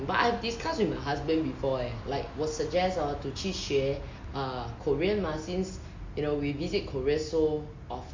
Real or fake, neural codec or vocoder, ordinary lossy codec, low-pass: real; none; MP3, 48 kbps; 7.2 kHz